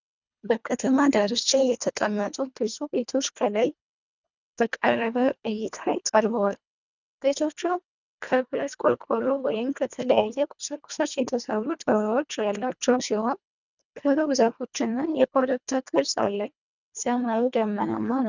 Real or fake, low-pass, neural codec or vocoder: fake; 7.2 kHz; codec, 24 kHz, 1.5 kbps, HILCodec